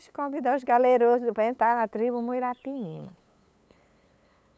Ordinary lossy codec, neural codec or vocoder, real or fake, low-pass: none; codec, 16 kHz, 8 kbps, FunCodec, trained on LibriTTS, 25 frames a second; fake; none